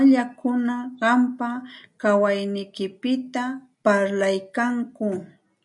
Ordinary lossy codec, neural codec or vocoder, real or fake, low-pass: MP3, 96 kbps; none; real; 10.8 kHz